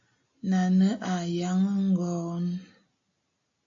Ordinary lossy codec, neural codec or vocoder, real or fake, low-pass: AAC, 48 kbps; none; real; 7.2 kHz